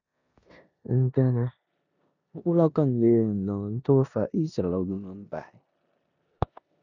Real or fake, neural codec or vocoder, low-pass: fake; codec, 16 kHz in and 24 kHz out, 0.9 kbps, LongCat-Audio-Codec, four codebook decoder; 7.2 kHz